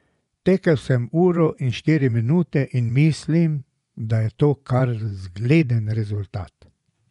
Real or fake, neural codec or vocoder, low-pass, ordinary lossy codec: fake; vocoder, 24 kHz, 100 mel bands, Vocos; 10.8 kHz; none